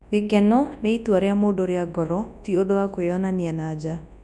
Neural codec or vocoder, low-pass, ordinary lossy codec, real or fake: codec, 24 kHz, 0.9 kbps, WavTokenizer, large speech release; none; none; fake